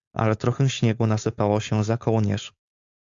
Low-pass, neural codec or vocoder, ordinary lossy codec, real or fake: 7.2 kHz; codec, 16 kHz, 4.8 kbps, FACodec; AAC, 64 kbps; fake